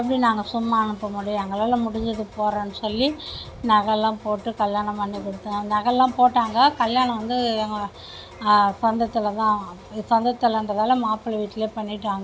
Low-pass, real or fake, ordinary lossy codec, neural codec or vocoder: none; real; none; none